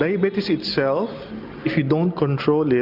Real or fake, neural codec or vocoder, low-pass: real; none; 5.4 kHz